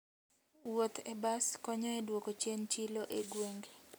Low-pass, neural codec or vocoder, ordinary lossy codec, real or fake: none; none; none; real